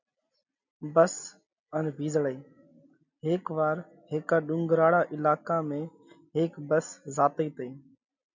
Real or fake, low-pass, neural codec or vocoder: real; 7.2 kHz; none